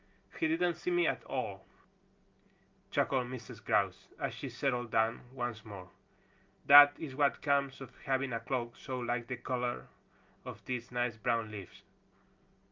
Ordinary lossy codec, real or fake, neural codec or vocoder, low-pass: Opus, 24 kbps; real; none; 7.2 kHz